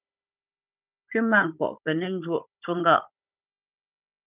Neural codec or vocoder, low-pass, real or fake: codec, 16 kHz, 16 kbps, FunCodec, trained on Chinese and English, 50 frames a second; 3.6 kHz; fake